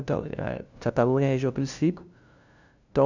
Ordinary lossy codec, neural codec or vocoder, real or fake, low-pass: none; codec, 16 kHz, 0.5 kbps, FunCodec, trained on LibriTTS, 25 frames a second; fake; 7.2 kHz